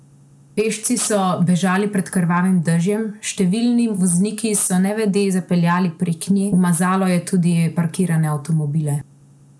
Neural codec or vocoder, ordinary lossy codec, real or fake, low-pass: none; none; real; none